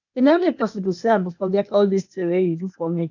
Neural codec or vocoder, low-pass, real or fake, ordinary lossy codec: codec, 16 kHz, 0.8 kbps, ZipCodec; 7.2 kHz; fake; none